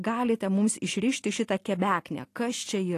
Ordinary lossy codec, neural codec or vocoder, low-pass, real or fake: AAC, 48 kbps; none; 14.4 kHz; real